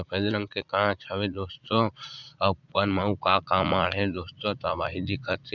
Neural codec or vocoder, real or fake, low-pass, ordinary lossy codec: vocoder, 44.1 kHz, 80 mel bands, Vocos; fake; 7.2 kHz; none